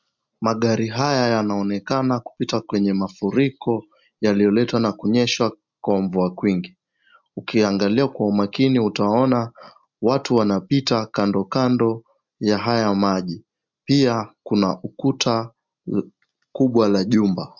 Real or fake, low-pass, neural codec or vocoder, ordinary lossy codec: real; 7.2 kHz; none; MP3, 64 kbps